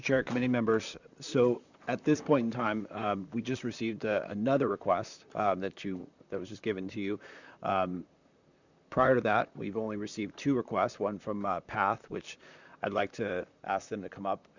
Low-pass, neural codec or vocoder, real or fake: 7.2 kHz; vocoder, 44.1 kHz, 128 mel bands, Pupu-Vocoder; fake